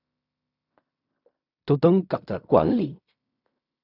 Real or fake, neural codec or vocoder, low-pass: fake; codec, 16 kHz in and 24 kHz out, 0.4 kbps, LongCat-Audio-Codec, fine tuned four codebook decoder; 5.4 kHz